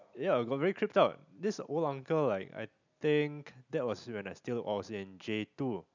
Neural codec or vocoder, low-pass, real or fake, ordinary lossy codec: none; 7.2 kHz; real; none